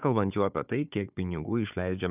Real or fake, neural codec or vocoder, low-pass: fake; codec, 16 kHz, 4 kbps, FunCodec, trained on Chinese and English, 50 frames a second; 3.6 kHz